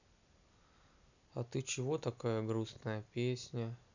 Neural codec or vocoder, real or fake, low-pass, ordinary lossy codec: none; real; 7.2 kHz; none